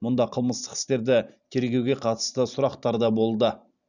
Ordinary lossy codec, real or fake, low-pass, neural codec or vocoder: none; fake; 7.2 kHz; vocoder, 44.1 kHz, 128 mel bands every 512 samples, BigVGAN v2